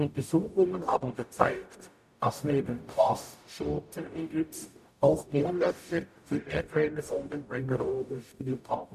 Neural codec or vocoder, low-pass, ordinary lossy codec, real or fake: codec, 44.1 kHz, 0.9 kbps, DAC; 14.4 kHz; none; fake